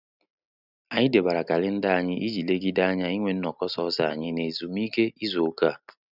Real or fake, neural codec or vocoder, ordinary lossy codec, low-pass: real; none; none; 5.4 kHz